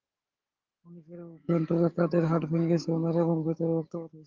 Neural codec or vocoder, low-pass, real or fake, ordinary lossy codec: codec, 44.1 kHz, 7.8 kbps, DAC; 7.2 kHz; fake; Opus, 32 kbps